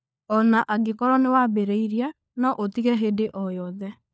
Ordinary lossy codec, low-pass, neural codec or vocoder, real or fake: none; none; codec, 16 kHz, 4 kbps, FunCodec, trained on LibriTTS, 50 frames a second; fake